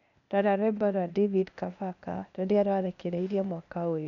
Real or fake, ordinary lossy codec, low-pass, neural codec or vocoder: fake; none; 7.2 kHz; codec, 16 kHz, 0.8 kbps, ZipCodec